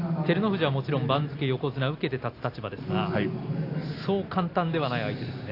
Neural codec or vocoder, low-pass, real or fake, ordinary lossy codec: none; 5.4 kHz; real; AAC, 32 kbps